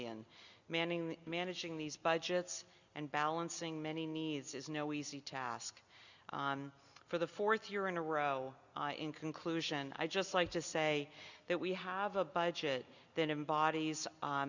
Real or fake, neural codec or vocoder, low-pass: real; none; 7.2 kHz